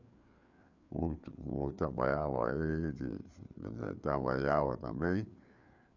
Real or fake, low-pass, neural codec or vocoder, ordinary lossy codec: fake; 7.2 kHz; codec, 16 kHz, 4 kbps, FreqCodec, larger model; none